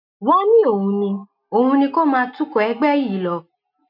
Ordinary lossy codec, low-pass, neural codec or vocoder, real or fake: none; 5.4 kHz; none; real